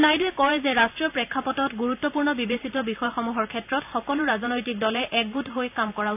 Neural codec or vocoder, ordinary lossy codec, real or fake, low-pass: vocoder, 44.1 kHz, 128 mel bands every 512 samples, BigVGAN v2; none; fake; 3.6 kHz